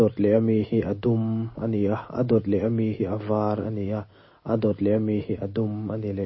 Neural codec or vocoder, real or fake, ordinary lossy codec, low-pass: none; real; MP3, 24 kbps; 7.2 kHz